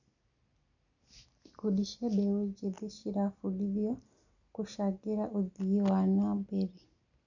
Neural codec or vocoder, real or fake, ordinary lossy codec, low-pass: none; real; none; 7.2 kHz